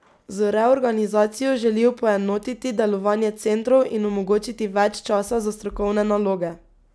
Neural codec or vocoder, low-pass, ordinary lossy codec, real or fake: none; none; none; real